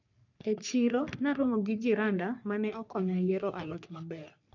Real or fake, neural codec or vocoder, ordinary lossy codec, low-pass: fake; codec, 44.1 kHz, 3.4 kbps, Pupu-Codec; none; 7.2 kHz